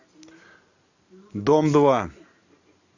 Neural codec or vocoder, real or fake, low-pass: none; real; 7.2 kHz